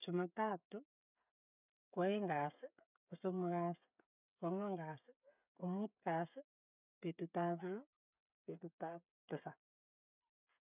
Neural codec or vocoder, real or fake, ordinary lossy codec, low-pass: codec, 16 kHz, 16 kbps, FreqCodec, smaller model; fake; none; 3.6 kHz